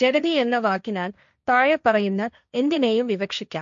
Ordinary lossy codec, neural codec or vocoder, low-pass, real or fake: MP3, 64 kbps; codec, 16 kHz, 1.1 kbps, Voila-Tokenizer; 7.2 kHz; fake